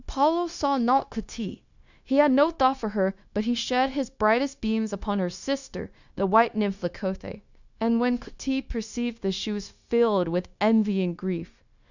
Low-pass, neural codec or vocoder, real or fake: 7.2 kHz; codec, 16 kHz, 0.9 kbps, LongCat-Audio-Codec; fake